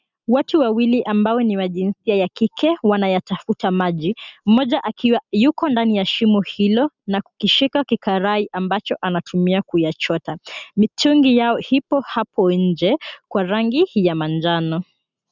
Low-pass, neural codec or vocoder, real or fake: 7.2 kHz; none; real